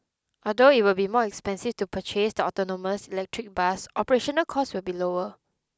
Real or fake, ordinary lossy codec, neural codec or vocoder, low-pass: real; none; none; none